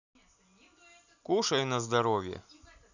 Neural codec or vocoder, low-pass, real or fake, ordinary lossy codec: none; 7.2 kHz; real; none